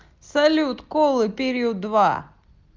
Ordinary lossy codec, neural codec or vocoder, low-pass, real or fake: Opus, 32 kbps; none; 7.2 kHz; real